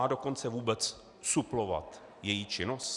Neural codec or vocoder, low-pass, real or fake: none; 10.8 kHz; real